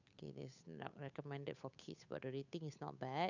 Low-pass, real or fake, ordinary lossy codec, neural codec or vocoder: 7.2 kHz; fake; none; codec, 16 kHz, 8 kbps, FunCodec, trained on Chinese and English, 25 frames a second